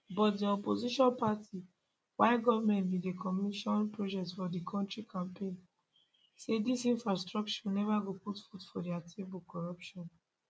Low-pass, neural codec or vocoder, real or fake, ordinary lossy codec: none; none; real; none